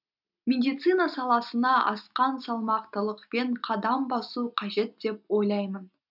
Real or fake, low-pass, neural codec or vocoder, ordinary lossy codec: real; 5.4 kHz; none; none